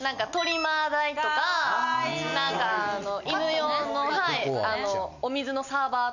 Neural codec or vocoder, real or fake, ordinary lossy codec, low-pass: none; real; none; 7.2 kHz